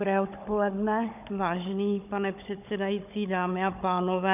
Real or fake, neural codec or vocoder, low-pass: fake; codec, 16 kHz, 16 kbps, FunCodec, trained on Chinese and English, 50 frames a second; 3.6 kHz